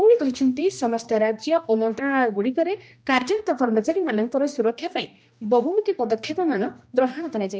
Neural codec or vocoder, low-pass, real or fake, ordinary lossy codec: codec, 16 kHz, 1 kbps, X-Codec, HuBERT features, trained on general audio; none; fake; none